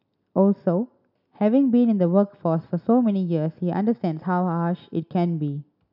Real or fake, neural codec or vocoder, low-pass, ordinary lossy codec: real; none; 5.4 kHz; none